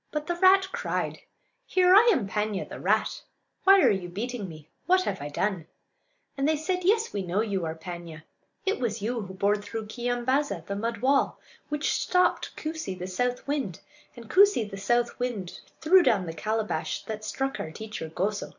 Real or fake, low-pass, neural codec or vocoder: real; 7.2 kHz; none